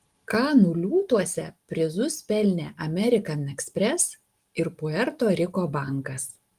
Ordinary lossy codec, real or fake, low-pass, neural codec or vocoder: Opus, 24 kbps; real; 14.4 kHz; none